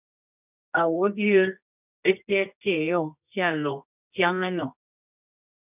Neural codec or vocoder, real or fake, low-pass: codec, 24 kHz, 0.9 kbps, WavTokenizer, medium music audio release; fake; 3.6 kHz